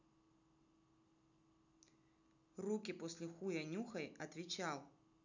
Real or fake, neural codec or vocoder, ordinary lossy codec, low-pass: real; none; none; 7.2 kHz